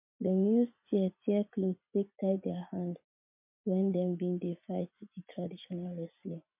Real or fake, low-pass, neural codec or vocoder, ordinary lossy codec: fake; 3.6 kHz; vocoder, 44.1 kHz, 80 mel bands, Vocos; MP3, 32 kbps